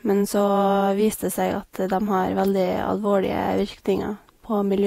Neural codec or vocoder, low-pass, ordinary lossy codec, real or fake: vocoder, 48 kHz, 128 mel bands, Vocos; 19.8 kHz; AAC, 48 kbps; fake